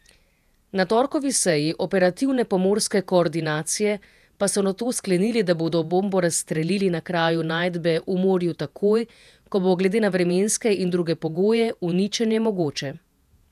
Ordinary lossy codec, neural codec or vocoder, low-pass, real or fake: none; vocoder, 48 kHz, 128 mel bands, Vocos; 14.4 kHz; fake